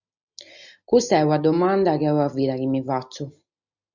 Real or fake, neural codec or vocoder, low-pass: real; none; 7.2 kHz